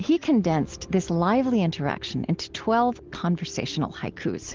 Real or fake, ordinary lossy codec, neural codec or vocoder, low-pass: real; Opus, 16 kbps; none; 7.2 kHz